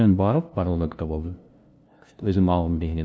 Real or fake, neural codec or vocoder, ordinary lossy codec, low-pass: fake; codec, 16 kHz, 0.5 kbps, FunCodec, trained on LibriTTS, 25 frames a second; none; none